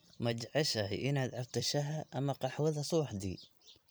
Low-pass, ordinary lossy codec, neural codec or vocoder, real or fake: none; none; vocoder, 44.1 kHz, 128 mel bands every 256 samples, BigVGAN v2; fake